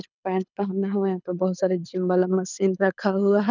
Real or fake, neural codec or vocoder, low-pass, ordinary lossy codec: fake; codec, 24 kHz, 6 kbps, HILCodec; 7.2 kHz; none